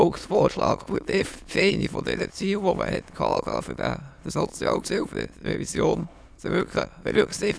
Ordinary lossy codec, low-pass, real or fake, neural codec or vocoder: none; none; fake; autoencoder, 22.05 kHz, a latent of 192 numbers a frame, VITS, trained on many speakers